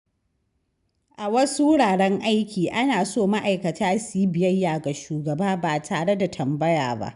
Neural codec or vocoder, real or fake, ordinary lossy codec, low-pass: none; real; none; 10.8 kHz